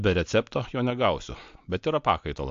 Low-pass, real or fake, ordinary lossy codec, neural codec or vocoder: 7.2 kHz; real; AAC, 64 kbps; none